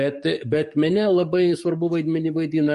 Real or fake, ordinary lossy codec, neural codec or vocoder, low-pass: fake; MP3, 48 kbps; codec, 44.1 kHz, 7.8 kbps, DAC; 14.4 kHz